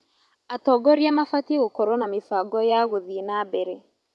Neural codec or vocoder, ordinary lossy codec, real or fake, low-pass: vocoder, 24 kHz, 100 mel bands, Vocos; none; fake; none